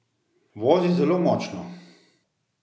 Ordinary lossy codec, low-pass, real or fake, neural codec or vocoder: none; none; real; none